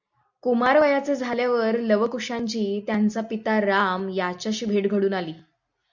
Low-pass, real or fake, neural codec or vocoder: 7.2 kHz; real; none